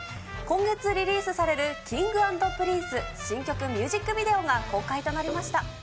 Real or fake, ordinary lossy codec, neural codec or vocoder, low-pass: real; none; none; none